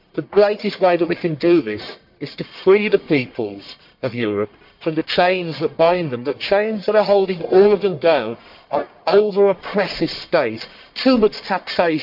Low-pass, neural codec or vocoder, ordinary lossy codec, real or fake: 5.4 kHz; codec, 44.1 kHz, 1.7 kbps, Pupu-Codec; MP3, 48 kbps; fake